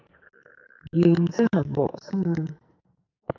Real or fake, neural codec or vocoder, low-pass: fake; codec, 44.1 kHz, 2.6 kbps, SNAC; 7.2 kHz